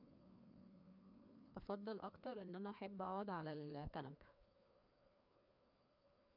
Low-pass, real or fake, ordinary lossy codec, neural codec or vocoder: 5.4 kHz; fake; MP3, 48 kbps; codec, 16 kHz, 2 kbps, FreqCodec, larger model